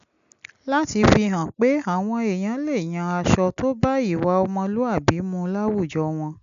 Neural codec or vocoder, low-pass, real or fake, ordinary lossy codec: none; 7.2 kHz; real; none